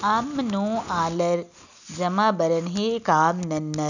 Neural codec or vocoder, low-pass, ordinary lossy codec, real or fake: none; 7.2 kHz; none; real